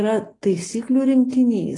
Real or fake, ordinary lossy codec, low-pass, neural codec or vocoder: real; AAC, 32 kbps; 10.8 kHz; none